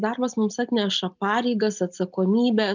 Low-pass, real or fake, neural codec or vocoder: 7.2 kHz; real; none